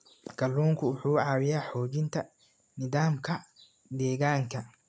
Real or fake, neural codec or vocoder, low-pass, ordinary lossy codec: real; none; none; none